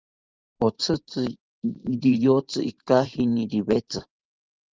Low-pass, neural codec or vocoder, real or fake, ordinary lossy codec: 7.2 kHz; vocoder, 44.1 kHz, 128 mel bands every 512 samples, BigVGAN v2; fake; Opus, 32 kbps